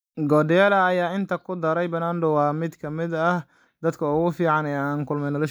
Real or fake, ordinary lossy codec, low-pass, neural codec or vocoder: real; none; none; none